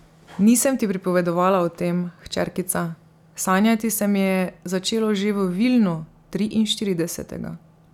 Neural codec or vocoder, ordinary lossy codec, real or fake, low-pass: none; none; real; 19.8 kHz